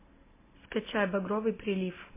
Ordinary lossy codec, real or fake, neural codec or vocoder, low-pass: MP3, 16 kbps; real; none; 3.6 kHz